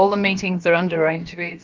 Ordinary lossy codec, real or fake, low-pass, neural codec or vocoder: Opus, 32 kbps; fake; 7.2 kHz; codec, 16 kHz, about 1 kbps, DyCAST, with the encoder's durations